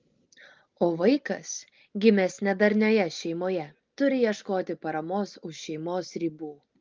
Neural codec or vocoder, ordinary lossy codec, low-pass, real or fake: none; Opus, 32 kbps; 7.2 kHz; real